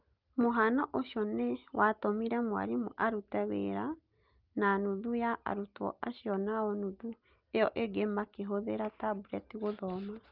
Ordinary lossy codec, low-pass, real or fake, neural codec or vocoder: Opus, 32 kbps; 5.4 kHz; real; none